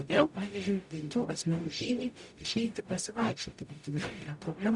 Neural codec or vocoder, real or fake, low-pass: codec, 44.1 kHz, 0.9 kbps, DAC; fake; 10.8 kHz